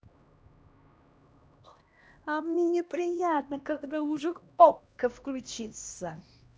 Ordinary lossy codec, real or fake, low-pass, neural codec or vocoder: none; fake; none; codec, 16 kHz, 1 kbps, X-Codec, HuBERT features, trained on LibriSpeech